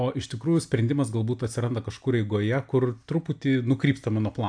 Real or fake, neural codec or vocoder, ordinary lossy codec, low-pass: real; none; AAC, 64 kbps; 9.9 kHz